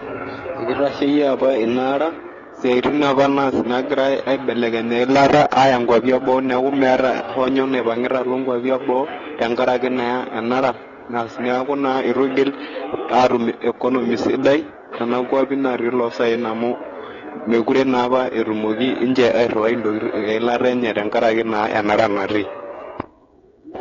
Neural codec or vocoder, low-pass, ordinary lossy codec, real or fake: codec, 16 kHz, 16 kbps, FreqCodec, smaller model; 7.2 kHz; AAC, 32 kbps; fake